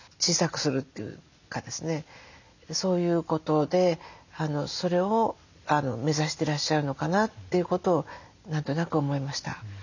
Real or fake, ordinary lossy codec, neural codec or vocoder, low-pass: real; none; none; 7.2 kHz